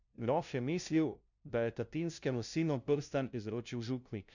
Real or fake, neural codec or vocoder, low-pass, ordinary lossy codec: fake; codec, 16 kHz, 0.5 kbps, FunCodec, trained on LibriTTS, 25 frames a second; 7.2 kHz; none